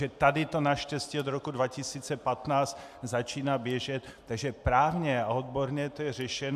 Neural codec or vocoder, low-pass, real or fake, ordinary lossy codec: none; 14.4 kHz; real; AAC, 96 kbps